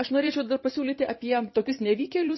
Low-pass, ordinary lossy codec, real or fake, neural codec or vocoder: 7.2 kHz; MP3, 24 kbps; fake; vocoder, 44.1 kHz, 128 mel bands every 512 samples, BigVGAN v2